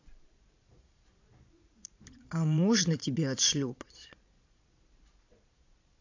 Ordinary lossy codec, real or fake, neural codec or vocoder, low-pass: AAC, 48 kbps; fake; vocoder, 22.05 kHz, 80 mel bands, Vocos; 7.2 kHz